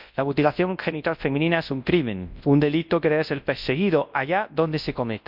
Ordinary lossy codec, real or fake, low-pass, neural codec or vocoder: MP3, 48 kbps; fake; 5.4 kHz; codec, 24 kHz, 0.9 kbps, WavTokenizer, large speech release